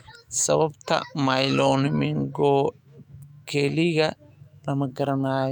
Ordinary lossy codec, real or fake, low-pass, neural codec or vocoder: none; fake; 19.8 kHz; autoencoder, 48 kHz, 128 numbers a frame, DAC-VAE, trained on Japanese speech